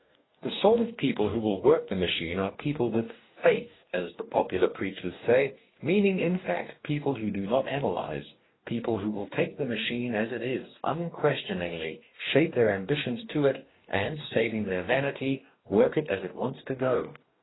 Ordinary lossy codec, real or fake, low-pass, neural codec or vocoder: AAC, 16 kbps; fake; 7.2 kHz; codec, 44.1 kHz, 2.6 kbps, DAC